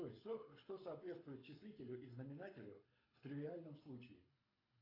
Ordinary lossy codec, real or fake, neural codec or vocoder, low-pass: AAC, 24 kbps; fake; codec, 24 kHz, 6 kbps, HILCodec; 5.4 kHz